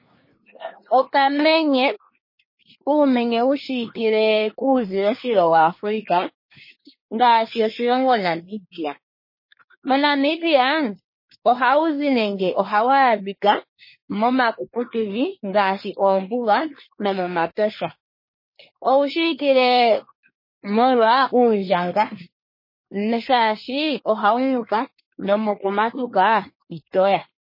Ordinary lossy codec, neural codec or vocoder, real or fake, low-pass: MP3, 24 kbps; codec, 24 kHz, 1 kbps, SNAC; fake; 5.4 kHz